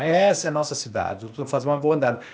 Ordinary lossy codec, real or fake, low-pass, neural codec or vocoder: none; fake; none; codec, 16 kHz, 0.8 kbps, ZipCodec